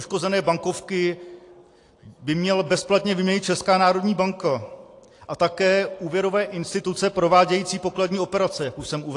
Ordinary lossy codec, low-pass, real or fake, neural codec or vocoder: AAC, 48 kbps; 10.8 kHz; real; none